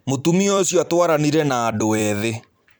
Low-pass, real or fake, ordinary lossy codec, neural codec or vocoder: none; fake; none; vocoder, 44.1 kHz, 128 mel bands every 256 samples, BigVGAN v2